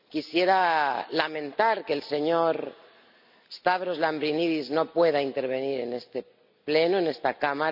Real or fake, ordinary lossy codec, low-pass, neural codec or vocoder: real; none; 5.4 kHz; none